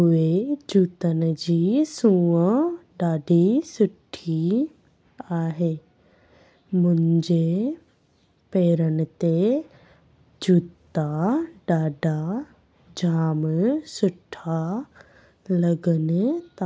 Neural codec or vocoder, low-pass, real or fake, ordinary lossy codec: none; none; real; none